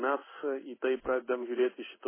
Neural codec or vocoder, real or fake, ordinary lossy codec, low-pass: codec, 16 kHz in and 24 kHz out, 1 kbps, XY-Tokenizer; fake; MP3, 16 kbps; 3.6 kHz